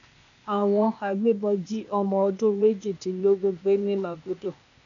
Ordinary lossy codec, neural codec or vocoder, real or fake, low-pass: none; codec, 16 kHz, 0.8 kbps, ZipCodec; fake; 7.2 kHz